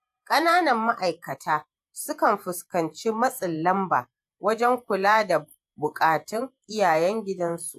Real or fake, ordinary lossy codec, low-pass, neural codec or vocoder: fake; AAC, 96 kbps; 14.4 kHz; vocoder, 48 kHz, 128 mel bands, Vocos